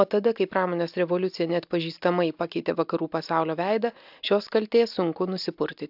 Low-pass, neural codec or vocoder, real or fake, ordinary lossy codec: 5.4 kHz; none; real; AAC, 48 kbps